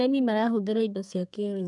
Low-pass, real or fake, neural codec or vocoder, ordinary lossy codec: 10.8 kHz; fake; codec, 32 kHz, 1.9 kbps, SNAC; none